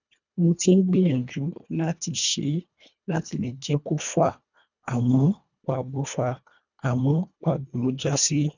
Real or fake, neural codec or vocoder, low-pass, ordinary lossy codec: fake; codec, 24 kHz, 1.5 kbps, HILCodec; 7.2 kHz; none